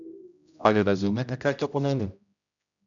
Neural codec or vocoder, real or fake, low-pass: codec, 16 kHz, 0.5 kbps, X-Codec, HuBERT features, trained on general audio; fake; 7.2 kHz